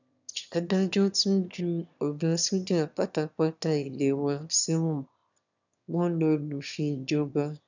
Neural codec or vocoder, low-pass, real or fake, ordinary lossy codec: autoencoder, 22.05 kHz, a latent of 192 numbers a frame, VITS, trained on one speaker; 7.2 kHz; fake; none